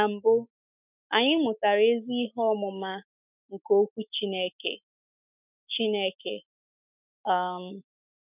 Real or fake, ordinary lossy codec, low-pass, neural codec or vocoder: fake; none; 3.6 kHz; autoencoder, 48 kHz, 128 numbers a frame, DAC-VAE, trained on Japanese speech